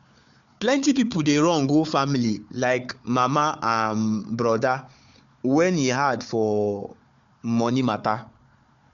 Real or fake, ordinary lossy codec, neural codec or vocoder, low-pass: fake; MP3, 64 kbps; codec, 16 kHz, 4 kbps, FunCodec, trained on Chinese and English, 50 frames a second; 7.2 kHz